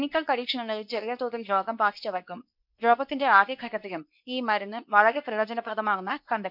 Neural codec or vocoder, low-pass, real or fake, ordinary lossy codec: codec, 24 kHz, 0.9 kbps, WavTokenizer, medium speech release version 2; 5.4 kHz; fake; none